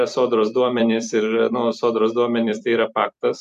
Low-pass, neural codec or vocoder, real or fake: 14.4 kHz; vocoder, 44.1 kHz, 128 mel bands every 256 samples, BigVGAN v2; fake